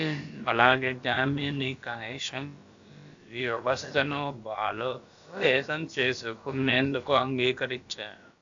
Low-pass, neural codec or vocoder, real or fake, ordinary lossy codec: 7.2 kHz; codec, 16 kHz, about 1 kbps, DyCAST, with the encoder's durations; fake; AAC, 64 kbps